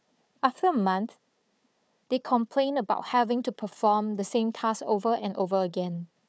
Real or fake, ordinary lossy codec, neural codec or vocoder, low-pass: fake; none; codec, 16 kHz, 4 kbps, FunCodec, trained on Chinese and English, 50 frames a second; none